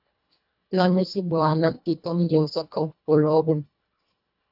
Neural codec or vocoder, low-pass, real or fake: codec, 24 kHz, 1.5 kbps, HILCodec; 5.4 kHz; fake